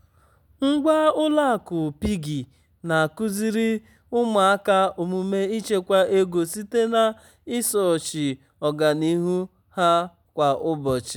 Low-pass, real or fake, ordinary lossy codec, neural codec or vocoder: 19.8 kHz; real; none; none